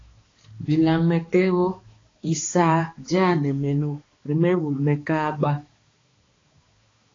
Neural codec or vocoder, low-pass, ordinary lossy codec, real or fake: codec, 16 kHz, 2 kbps, X-Codec, HuBERT features, trained on balanced general audio; 7.2 kHz; AAC, 32 kbps; fake